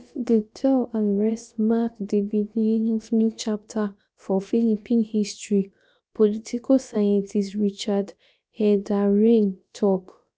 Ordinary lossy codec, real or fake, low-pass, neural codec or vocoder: none; fake; none; codec, 16 kHz, about 1 kbps, DyCAST, with the encoder's durations